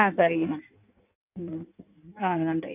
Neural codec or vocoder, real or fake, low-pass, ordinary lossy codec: codec, 16 kHz in and 24 kHz out, 1.1 kbps, FireRedTTS-2 codec; fake; 3.6 kHz; none